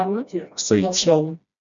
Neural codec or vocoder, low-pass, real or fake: codec, 16 kHz, 1 kbps, FreqCodec, smaller model; 7.2 kHz; fake